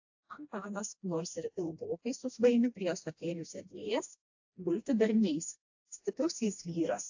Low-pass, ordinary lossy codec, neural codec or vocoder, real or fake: 7.2 kHz; AAC, 48 kbps; codec, 16 kHz, 1 kbps, FreqCodec, smaller model; fake